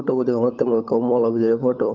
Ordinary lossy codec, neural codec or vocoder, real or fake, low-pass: Opus, 24 kbps; codec, 16 kHz, 16 kbps, FunCodec, trained on LibriTTS, 50 frames a second; fake; 7.2 kHz